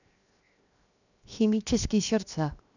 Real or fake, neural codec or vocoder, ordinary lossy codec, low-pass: fake; codec, 16 kHz, 0.7 kbps, FocalCodec; none; 7.2 kHz